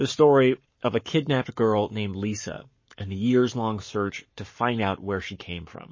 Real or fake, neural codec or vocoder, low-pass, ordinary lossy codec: fake; codec, 44.1 kHz, 7.8 kbps, Pupu-Codec; 7.2 kHz; MP3, 32 kbps